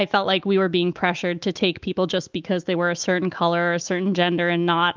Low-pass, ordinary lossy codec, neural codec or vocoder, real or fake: 7.2 kHz; Opus, 32 kbps; autoencoder, 48 kHz, 128 numbers a frame, DAC-VAE, trained on Japanese speech; fake